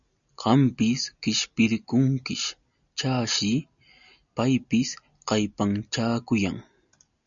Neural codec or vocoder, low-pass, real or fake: none; 7.2 kHz; real